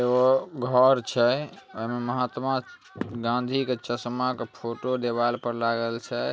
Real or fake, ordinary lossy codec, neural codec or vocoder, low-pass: real; none; none; none